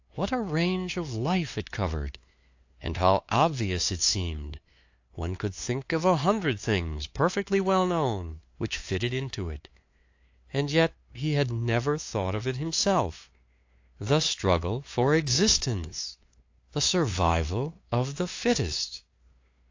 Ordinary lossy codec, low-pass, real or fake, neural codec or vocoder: AAC, 48 kbps; 7.2 kHz; fake; codec, 16 kHz, 2 kbps, FunCodec, trained on LibriTTS, 25 frames a second